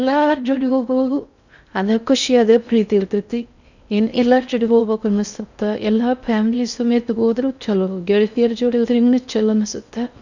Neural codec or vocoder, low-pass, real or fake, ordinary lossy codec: codec, 16 kHz in and 24 kHz out, 0.6 kbps, FocalCodec, streaming, 4096 codes; 7.2 kHz; fake; none